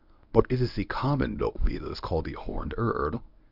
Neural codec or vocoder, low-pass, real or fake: codec, 24 kHz, 0.9 kbps, WavTokenizer, medium speech release version 1; 5.4 kHz; fake